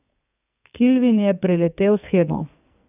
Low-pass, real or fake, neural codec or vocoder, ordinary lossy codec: 3.6 kHz; fake; codec, 44.1 kHz, 2.6 kbps, SNAC; none